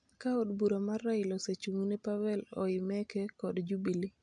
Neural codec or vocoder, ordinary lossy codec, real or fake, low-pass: none; MP3, 64 kbps; real; 9.9 kHz